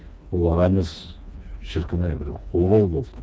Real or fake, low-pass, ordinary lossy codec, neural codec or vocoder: fake; none; none; codec, 16 kHz, 2 kbps, FreqCodec, smaller model